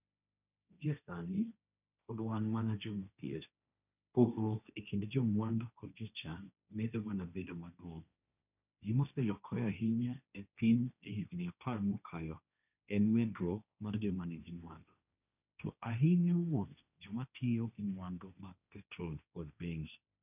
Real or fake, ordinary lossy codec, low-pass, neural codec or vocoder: fake; none; 3.6 kHz; codec, 16 kHz, 1.1 kbps, Voila-Tokenizer